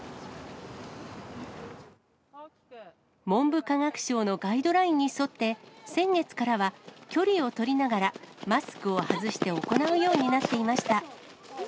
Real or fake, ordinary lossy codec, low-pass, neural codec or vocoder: real; none; none; none